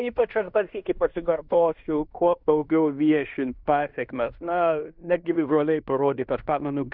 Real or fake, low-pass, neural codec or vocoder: fake; 5.4 kHz; codec, 16 kHz in and 24 kHz out, 0.9 kbps, LongCat-Audio-Codec, fine tuned four codebook decoder